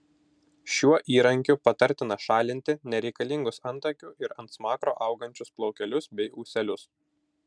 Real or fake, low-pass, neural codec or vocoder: real; 9.9 kHz; none